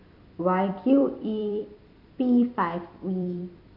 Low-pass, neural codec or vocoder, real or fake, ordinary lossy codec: 5.4 kHz; vocoder, 44.1 kHz, 128 mel bands every 256 samples, BigVGAN v2; fake; none